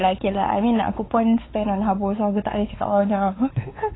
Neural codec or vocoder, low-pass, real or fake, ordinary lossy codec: codec, 16 kHz, 4 kbps, X-Codec, WavLM features, trained on Multilingual LibriSpeech; 7.2 kHz; fake; AAC, 16 kbps